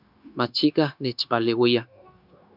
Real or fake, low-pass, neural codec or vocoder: fake; 5.4 kHz; codec, 16 kHz, 0.9 kbps, LongCat-Audio-Codec